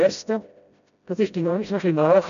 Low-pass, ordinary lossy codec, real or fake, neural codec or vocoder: 7.2 kHz; AAC, 96 kbps; fake; codec, 16 kHz, 0.5 kbps, FreqCodec, smaller model